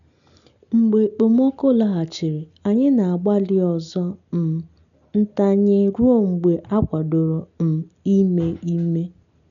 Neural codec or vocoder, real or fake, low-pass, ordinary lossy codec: none; real; 7.2 kHz; none